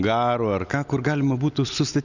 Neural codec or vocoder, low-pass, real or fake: none; 7.2 kHz; real